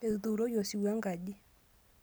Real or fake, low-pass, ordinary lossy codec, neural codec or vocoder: real; none; none; none